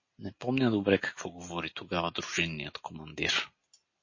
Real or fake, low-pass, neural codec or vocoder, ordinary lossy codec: real; 7.2 kHz; none; MP3, 32 kbps